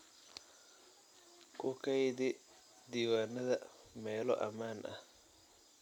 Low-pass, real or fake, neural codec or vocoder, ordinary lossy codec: 19.8 kHz; real; none; MP3, 96 kbps